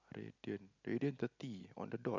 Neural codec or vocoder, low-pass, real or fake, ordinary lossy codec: none; 7.2 kHz; real; none